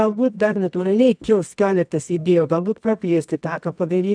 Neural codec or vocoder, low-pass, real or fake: codec, 24 kHz, 0.9 kbps, WavTokenizer, medium music audio release; 9.9 kHz; fake